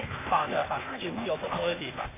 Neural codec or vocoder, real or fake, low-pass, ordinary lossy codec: codec, 16 kHz, 0.8 kbps, ZipCodec; fake; 3.6 kHz; AAC, 16 kbps